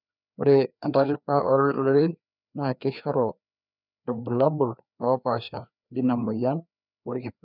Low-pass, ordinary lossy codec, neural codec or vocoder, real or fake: 5.4 kHz; none; codec, 16 kHz, 2 kbps, FreqCodec, larger model; fake